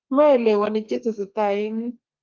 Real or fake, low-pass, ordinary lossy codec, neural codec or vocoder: fake; 7.2 kHz; Opus, 32 kbps; codec, 32 kHz, 1.9 kbps, SNAC